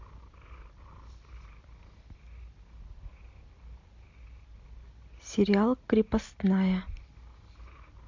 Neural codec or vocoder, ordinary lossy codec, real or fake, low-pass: none; MP3, 48 kbps; real; 7.2 kHz